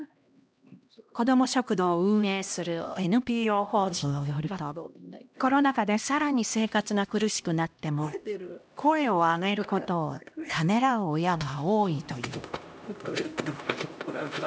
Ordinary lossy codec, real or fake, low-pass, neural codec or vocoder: none; fake; none; codec, 16 kHz, 1 kbps, X-Codec, HuBERT features, trained on LibriSpeech